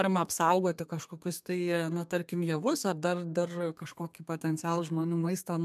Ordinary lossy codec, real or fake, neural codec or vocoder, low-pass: MP3, 96 kbps; fake; codec, 32 kHz, 1.9 kbps, SNAC; 14.4 kHz